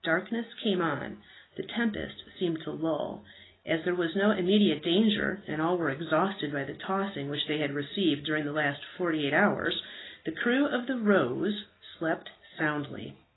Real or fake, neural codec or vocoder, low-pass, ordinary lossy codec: real; none; 7.2 kHz; AAC, 16 kbps